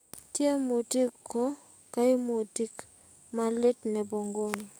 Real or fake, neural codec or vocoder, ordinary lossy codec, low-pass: fake; codec, 44.1 kHz, 7.8 kbps, DAC; none; none